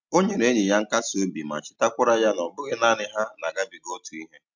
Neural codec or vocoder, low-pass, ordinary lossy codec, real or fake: none; 7.2 kHz; MP3, 64 kbps; real